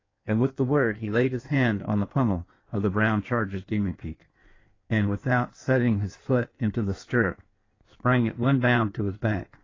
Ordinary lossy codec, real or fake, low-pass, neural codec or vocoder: AAC, 32 kbps; fake; 7.2 kHz; codec, 16 kHz in and 24 kHz out, 1.1 kbps, FireRedTTS-2 codec